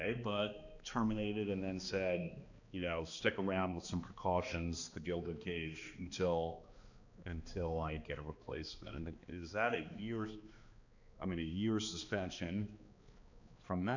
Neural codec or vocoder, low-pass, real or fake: codec, 16 kHz, 2 kbps, X-Codec, HuBERT features, trained on balanced general audio; 7.2 kHz; fake